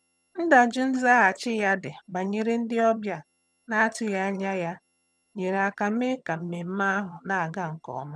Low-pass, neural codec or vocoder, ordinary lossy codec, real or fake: none; vocoder, 22.05 kHz, 80 mel bands, HiFi-GAN; none; fake